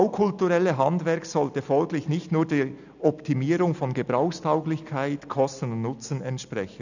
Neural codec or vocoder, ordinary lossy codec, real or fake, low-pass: none; none; real; 7.2 kHz